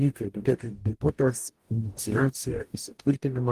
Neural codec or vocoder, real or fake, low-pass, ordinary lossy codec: codec, 44.1 kHz, 0.9 kbps, DAC; fake; 14.4 kHz; Opus, 24 kbps